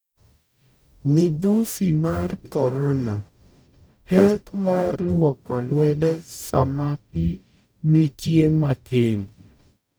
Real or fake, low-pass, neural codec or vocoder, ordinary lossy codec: fake; none; codec, 44.1 kHz, 0.9 kbps, DAC; none